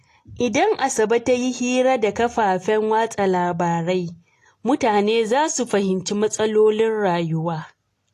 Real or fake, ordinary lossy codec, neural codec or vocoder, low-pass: real; AAC, 48 kbps; none; 14.4 kHz